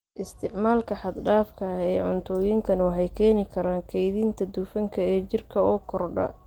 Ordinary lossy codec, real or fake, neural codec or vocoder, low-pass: Opus, 24 kbps; real; none; 19.8 kHz